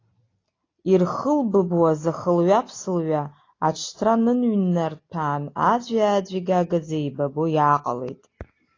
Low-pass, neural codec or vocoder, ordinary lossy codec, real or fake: 7.2 kHz; none; AAC, 32 kbps; real